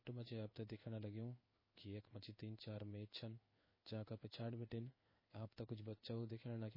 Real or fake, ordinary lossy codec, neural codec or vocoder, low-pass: fake; MP3, 24 kbps; codec, 16 kHz in and 24 kHz out, 1 kbps, XY-Tokenizer; 5.4 kHz